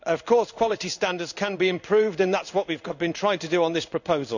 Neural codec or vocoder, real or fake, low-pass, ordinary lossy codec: codec, 16 kHz in and 24 kHz out, 1 kbps, XY-Tokenizer; fake; 7.2 kHz; none